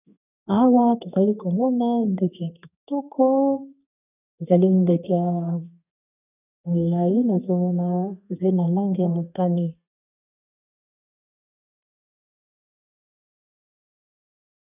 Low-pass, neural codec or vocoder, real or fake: 3.6 kHz; codec, 32 kHz, 1.9 kbps, SNAC; fake